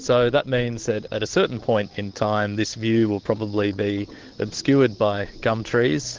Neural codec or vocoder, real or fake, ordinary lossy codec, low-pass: codec, 16 kHz, 8 kbps, FunCodec, trained on Chinese and English, 25 frames a second; fake; Opus, 16 kbps; 7.2 kHz